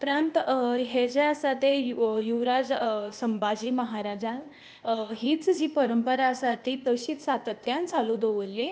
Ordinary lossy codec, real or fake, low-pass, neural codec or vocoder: none; fake; none; codec, 16 kHz, 0.8 kbps, ZipCodec